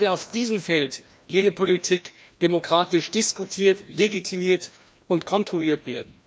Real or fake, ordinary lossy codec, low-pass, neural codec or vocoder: fake; none; none; codec, 16 kHz, 1 kbps, FreqCodec, larger model